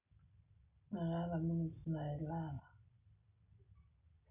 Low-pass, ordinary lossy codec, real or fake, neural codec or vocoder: 3.6 kHz; none; real; none